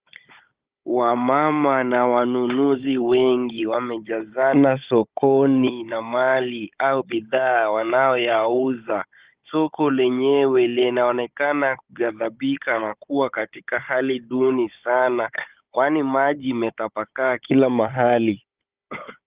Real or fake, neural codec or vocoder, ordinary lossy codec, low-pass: fake; codec, 16 kHz, 16 kbps, FunCodec, trained on Chinese and English, 50 frames a second; Opus, 32 kbps; 3.6 kHz